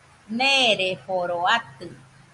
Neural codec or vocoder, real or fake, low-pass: none; real; 10.8 kHz